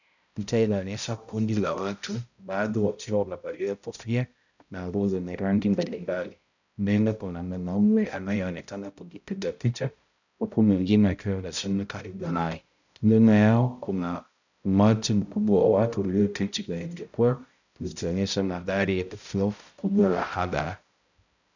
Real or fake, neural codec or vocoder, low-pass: fake; codec, 16 kHz, 0.5 kbps, X-Codec, HuBERT features, trained on balanced general audio; 7.2 kHz